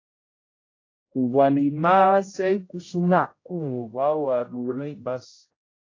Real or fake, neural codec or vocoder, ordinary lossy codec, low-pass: fake; codec, 16 kHz, 0.5 kbps, X-Codec, HuBERT features, trained on general audio; AAC, 32 kbps; 7.2 kHz